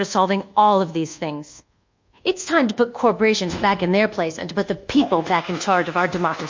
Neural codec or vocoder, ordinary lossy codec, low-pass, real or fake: codec, 24 kHz, 1.2 kbps, DualCodec; MP3, 48 kbps; 7.2 kHz; fake